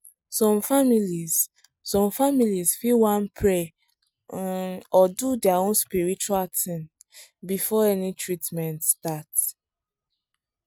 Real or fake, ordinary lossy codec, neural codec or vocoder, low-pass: real; none; none; none